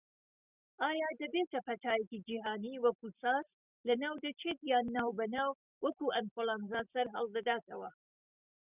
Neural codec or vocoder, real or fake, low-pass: none; real; 3.6 kHz